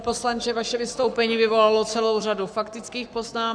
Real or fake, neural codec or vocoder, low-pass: fake; codec, 44.1 kHz, 7.8 kbps, Pupu-Codec; 9.9 kHz